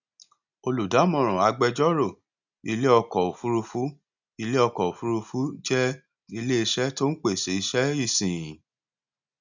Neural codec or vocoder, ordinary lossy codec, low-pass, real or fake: none; none; 7.2 kHz; real